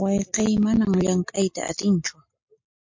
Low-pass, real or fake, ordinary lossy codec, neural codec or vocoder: 7.2 kHz; real; MP3, 48 kbps; none